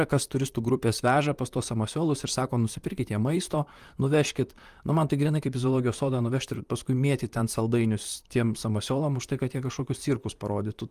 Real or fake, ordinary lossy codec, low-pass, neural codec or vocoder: fake; Opus, 32 kbps; 14.4 kHz; vocoder, 44.1 kHz, 128 mel bands, Pupu-Vocoder